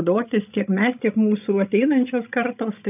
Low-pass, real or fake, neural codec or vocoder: 3.6 kHz; fake; codec, 16 kHz, 16 kbps, FreqCodec, larger model